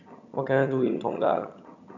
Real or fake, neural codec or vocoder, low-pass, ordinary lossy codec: fake; vocoder, 22.05 kHz, 80 mel bands, HiFi-GAN; 7.2 kHz; none